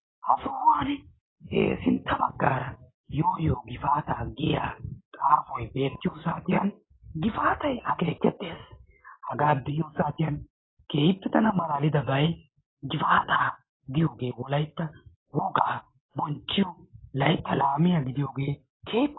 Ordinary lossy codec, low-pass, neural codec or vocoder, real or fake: AAC, 16 kbps; 7.2 kHz; none; real